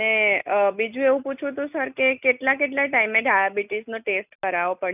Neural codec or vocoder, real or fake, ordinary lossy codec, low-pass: none; real; none; 3.6 kHz